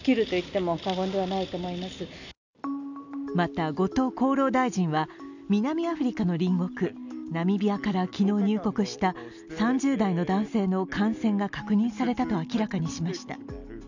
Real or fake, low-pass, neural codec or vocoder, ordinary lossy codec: real; 7.2 kHz; none; none